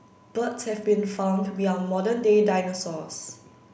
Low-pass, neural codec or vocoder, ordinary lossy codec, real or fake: none; none; none; real